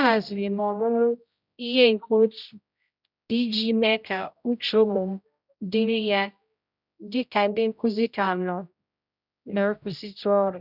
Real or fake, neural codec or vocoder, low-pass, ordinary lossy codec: fake; codec, 16 kHz, 0.5 kbps, X-Codec, HuBERT features, trained on general audio; 5.4 kHz; none